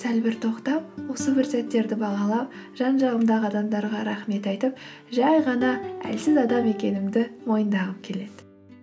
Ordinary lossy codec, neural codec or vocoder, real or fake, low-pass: none; none; real; none